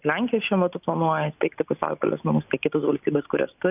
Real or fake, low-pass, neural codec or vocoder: real; 3.6 kHz; none